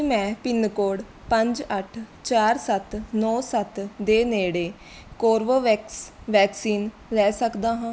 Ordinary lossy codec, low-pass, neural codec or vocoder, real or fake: none; none; none; real